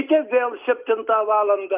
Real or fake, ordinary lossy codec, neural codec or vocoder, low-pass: real; Opus, 24 kbps; none; 3.6 kHz